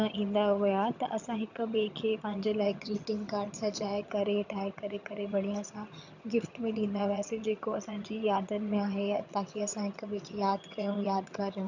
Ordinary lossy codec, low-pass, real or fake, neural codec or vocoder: none; 7.2 kHz; fake; vocoder, 22.05 kHz, 80 mel bands, HiFi-GAN